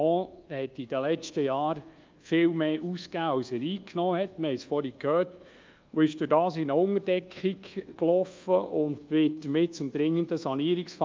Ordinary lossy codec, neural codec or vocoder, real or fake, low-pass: Opus, 24 kbps; codec, 24 kHz, 1.2 kbps, DualCodec; fake; 7.2 kHz